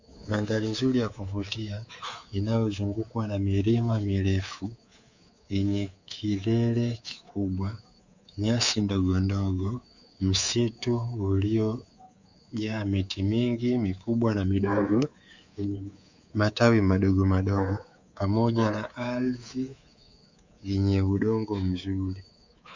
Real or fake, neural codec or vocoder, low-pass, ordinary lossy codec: fake; codec, 24 kHz, 3.1 kbps, DualCodec; 7.2 kHz; Opus, 64 kbps